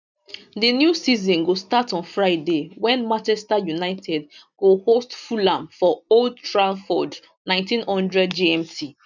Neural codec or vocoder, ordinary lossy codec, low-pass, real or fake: none; none; 7.2 kHz; real